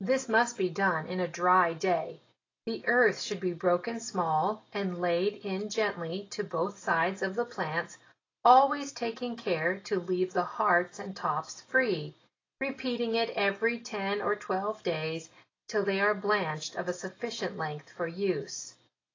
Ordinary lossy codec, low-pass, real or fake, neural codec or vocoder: AAC, 32 kbps; 7.2 kHz; real; none